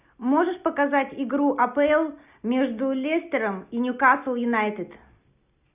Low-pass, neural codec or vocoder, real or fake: 3.6 kHz; none; real